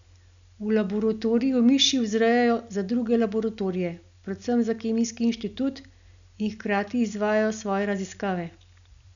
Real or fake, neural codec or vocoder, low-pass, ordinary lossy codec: real; none; 7.2 kHz; none